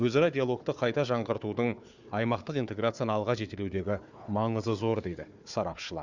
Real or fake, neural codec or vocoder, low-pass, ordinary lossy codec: fake; codec, 16 kHz, 4 kbps, FunCodec, trained on Chinese and English, 50 frames a second; 7.2 kHz; Opus, 64 kbps